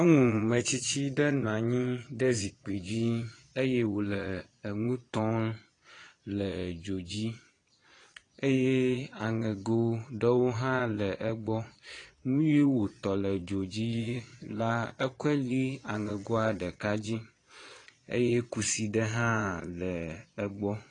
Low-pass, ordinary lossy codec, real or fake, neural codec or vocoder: 9.9 kHz; AAC, 32 kbps; fake; vocoder, 22.05 kHz, 80 mel bands, Vocos